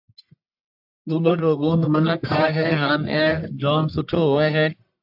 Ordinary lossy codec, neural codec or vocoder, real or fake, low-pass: AAC, 48 kbps; codec, 44.1 kHz, 1.7 kbps, Pupu-Codec; fake; 5.4 kHz